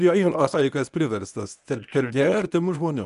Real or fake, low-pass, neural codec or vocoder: fake; 10.8 kHz; codec, 24 kHz, 0.9 kbps, WavTokenizer, medium speech release version 1